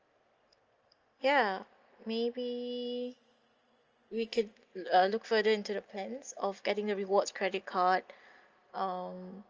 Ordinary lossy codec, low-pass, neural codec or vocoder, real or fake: Opus, 24 kbps; 7.2 kHz; none; real